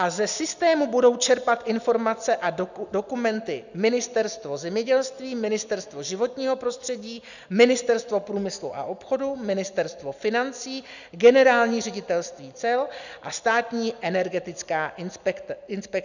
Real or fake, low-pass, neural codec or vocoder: real; 7.2 kHz; none